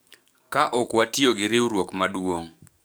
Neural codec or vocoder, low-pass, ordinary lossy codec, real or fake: codec, 44.1 kHz, 7.8 kbps, DAC; none; none; fake